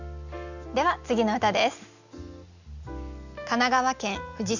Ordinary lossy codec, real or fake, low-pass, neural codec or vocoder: none; real; 7.2 kHz; none